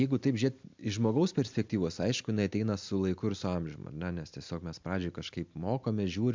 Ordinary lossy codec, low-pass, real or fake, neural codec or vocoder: MP3, 64 kbps; 7.2 kHz; real; none